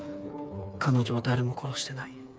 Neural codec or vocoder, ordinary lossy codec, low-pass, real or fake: codec, 16 kHz, 4 kbps, FreqCodec, smaller model; none; none; fake